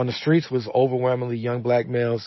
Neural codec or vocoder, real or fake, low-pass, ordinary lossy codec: none; real; 7.2 kHz; MP3, 24 kbps